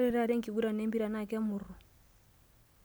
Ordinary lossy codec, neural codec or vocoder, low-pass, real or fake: none; none; none; real